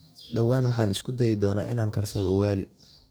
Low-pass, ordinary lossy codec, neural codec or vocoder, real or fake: none; none; codec, 44.1 kHz, 2.6 kbps, DAC; fake